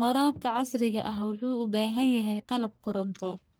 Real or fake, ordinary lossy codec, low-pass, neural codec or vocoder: fake; none; none; codec, 44.1 kHz, 1.7 kbps, Pupu-Codec